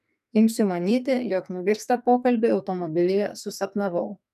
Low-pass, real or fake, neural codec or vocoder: 14.4 kHz; fake; codec, 44.1 kHz, 2.6 kbps, SNAC